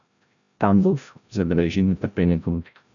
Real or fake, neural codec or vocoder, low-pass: fake; codec, 16 kHz, 0.5 kbps, FreqCodec, larger model; 7.2 kHz